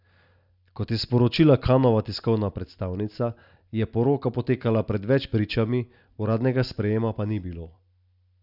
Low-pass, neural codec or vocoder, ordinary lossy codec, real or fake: 5.4 kHz; none; none; real